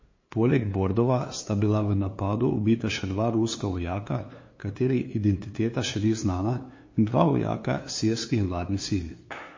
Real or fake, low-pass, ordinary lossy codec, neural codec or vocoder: fake; 7.2 kHz; MP3, 32 kbps; codec, 16 kHz, 2 kbps, FunCodec, trained on LibriTTS, 25 frames a second